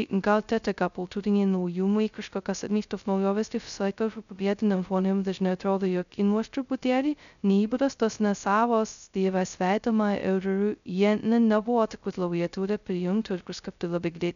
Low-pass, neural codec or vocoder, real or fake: 7.2 kHz; codec, 16 kHz, 0.2 kbps, FocalCodec; fake